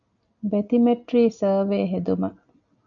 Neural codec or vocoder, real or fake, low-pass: none; real; 7.2 kHz